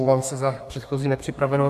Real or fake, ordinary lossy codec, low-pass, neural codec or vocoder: fake; AAC, 96 kbps; 14.4 kHz; codec, 32 kHz, 1.9 kbps, SNAC